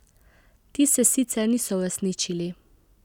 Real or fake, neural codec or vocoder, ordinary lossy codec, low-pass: real; none; none; 19.8 kHz